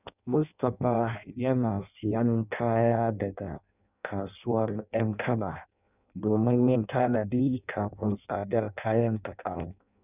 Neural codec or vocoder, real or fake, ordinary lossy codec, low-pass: codec, 16 kHz in and 24 kHz out, 0.6 kbps, FireRedTTS-2 codec; fake; none; 3.6 kHz